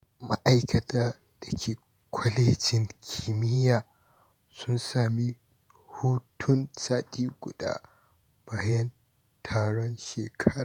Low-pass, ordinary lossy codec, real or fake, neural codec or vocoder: 19.8 kHz; none; fake; vocoder, 48 kHz, 128 mel bands, Vocos